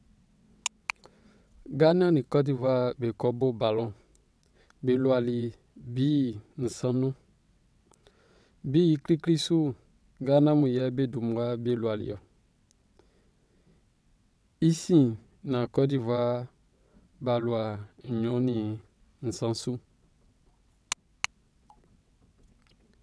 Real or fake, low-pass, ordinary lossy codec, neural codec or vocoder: fake; none; none; vocoder, 22.05 kHz, 80 mel bands, WaveNeXt